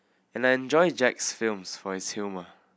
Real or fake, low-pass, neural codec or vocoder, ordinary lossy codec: real; none; none; none